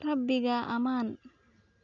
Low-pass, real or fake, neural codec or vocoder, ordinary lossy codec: 7.2 kHz; real; none; none